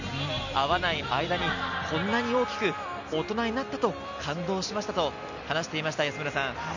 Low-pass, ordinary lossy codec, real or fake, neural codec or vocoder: 7.2 kHz; none; real; none